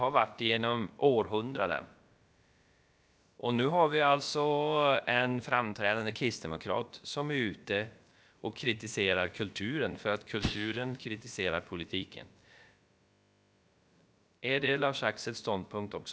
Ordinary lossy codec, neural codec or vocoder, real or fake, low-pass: none; codec, 16 kHz, about 1 kbps, DyCAST, with the encoder's durations; fake; none